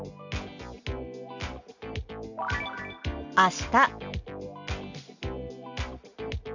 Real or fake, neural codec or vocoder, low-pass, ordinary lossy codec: real; none; 7.2 kHz; none